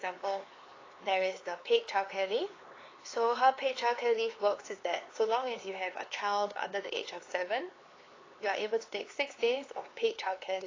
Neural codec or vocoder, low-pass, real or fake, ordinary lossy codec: codec, 16 kHz, 4 kbps, X-Codec, HuBERT features, trained on LibriSpeech; 7.2 kHz; fake; AAC, 32 kbps